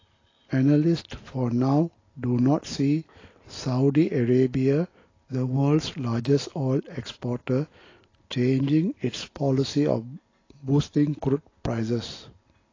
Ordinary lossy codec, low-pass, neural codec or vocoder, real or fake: AAC, 32 kbps; 7.2 kHz; none; real